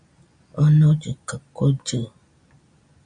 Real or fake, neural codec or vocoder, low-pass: real; none; 9.9 kHz